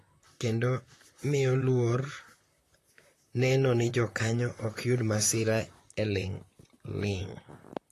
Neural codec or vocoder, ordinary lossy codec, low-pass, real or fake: vocoder, 44.1 kHz, 128 mel bands, Pupu-Vocoder; AAC, 48 kbps; 14.4 kHz; fake